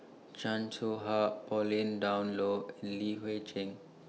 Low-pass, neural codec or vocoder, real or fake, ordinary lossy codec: none; none; real; none